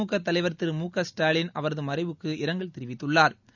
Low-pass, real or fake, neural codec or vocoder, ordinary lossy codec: 7.2 kHz; real; none; none